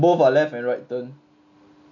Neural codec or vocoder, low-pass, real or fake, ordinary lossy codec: none; 7.2 kHz; real; none